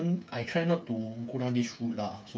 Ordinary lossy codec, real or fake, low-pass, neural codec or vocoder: none; fake; none; codec, 16 kHz, 8 kbps, FreqCodec, smaller model